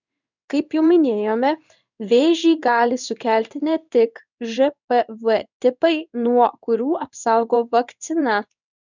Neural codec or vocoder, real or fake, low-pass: codec, 16 kHz in and 24 kHz out, 1 kbps, XY-Tokenizer; fake; 7.2 kHz